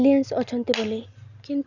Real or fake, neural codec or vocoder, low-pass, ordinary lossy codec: real; none; 7.2 kHz; none